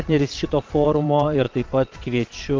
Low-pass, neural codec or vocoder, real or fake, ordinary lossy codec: 7.2 kHz; vocoder, 22.05 kHz, 80 mel bands, Vocos; fake; Opus, 32 kbps